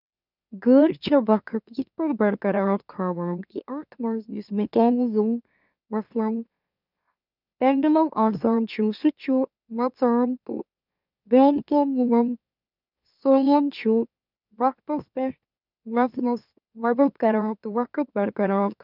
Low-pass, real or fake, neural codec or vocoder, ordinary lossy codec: 5.4 kHz; fake; autoencoder, 44.1 kHz, a latent of 192 numbers a frame, MeloTTS; none